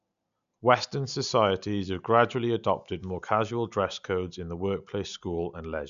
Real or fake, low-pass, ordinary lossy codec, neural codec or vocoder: real; 7.2 kHz; none; none